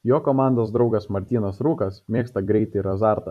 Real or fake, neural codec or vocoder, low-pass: fake; vocoder, 44.1 kHz, 128 mel bands every 256 samples, BigVGAN v2; 14.4 kHz